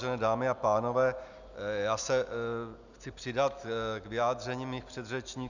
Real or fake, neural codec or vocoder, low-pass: real; none; 7.2 kHz